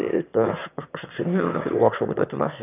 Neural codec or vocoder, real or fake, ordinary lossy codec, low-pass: autoencoder, 22.05 kHz, a latent of 192 numbers a frame, VITS, trained on one speaker; fake; none; 3.6 kHz